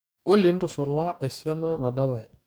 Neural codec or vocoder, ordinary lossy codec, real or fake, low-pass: codec, 44.1 kHz, 2.6 kbps, DAC; none; fake; none